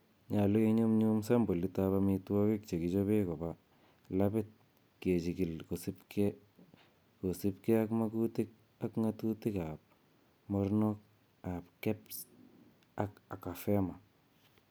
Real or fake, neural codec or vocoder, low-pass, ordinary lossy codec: real; none; none; none